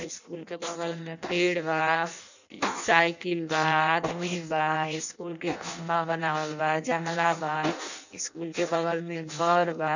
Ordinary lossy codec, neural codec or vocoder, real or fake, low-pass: none; codec, 16 kHz in and 24 kHz out, 0.6 kbps, FireRedTTS-2 codec; fake; 7.2 kHz